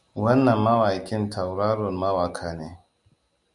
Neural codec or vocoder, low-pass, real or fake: none; 10.8 kHz; real